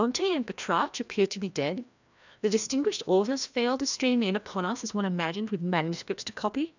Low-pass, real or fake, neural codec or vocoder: 7.2 kHz; fake; codec, 16 kHz, 1 kbps, FreqCodec, larger model